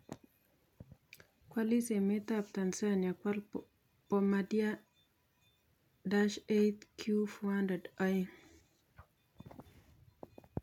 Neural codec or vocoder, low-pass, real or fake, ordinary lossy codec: none; 19.8 kHz; real; none